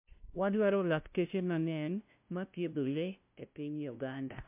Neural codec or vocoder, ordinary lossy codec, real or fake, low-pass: codec, 16 kHz, 0.5 kbps, FunCodec, trained on LibriTTS, 25 frames a second; MP3, 32 kbps; fake; 3.6 kHz